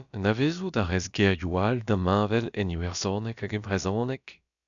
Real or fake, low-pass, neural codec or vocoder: fake; 7.2 kHz; codec, 16 kHz, about 1 kbps, DyCAST, with the encoder's durations